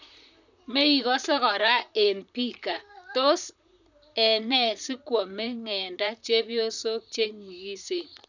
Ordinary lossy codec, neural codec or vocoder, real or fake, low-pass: none; vocoder, 44.1 kHz, 128 mel bands, Pupu-Vocoder; fake; 7.2 kHz